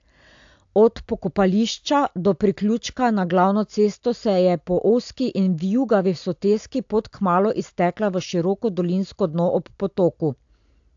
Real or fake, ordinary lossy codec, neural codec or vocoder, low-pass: real; AAC, 64 kbps; none; 7.2 kHz